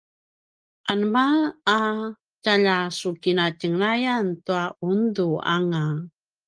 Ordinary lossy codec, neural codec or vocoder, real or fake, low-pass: Opus, 24 kbps; none; real; 9.9 kHz